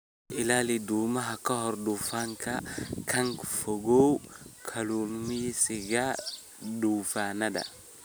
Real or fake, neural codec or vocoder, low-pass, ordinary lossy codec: real; none; none; none